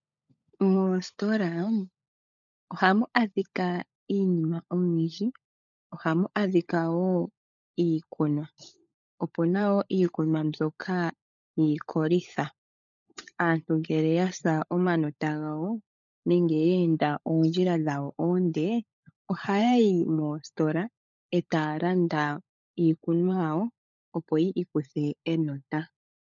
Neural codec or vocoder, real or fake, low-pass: codec, 16 kHz, 16 kbps, FunCodec, trained on LibriTTS, 50 frames a second; fake; 7.2 kHz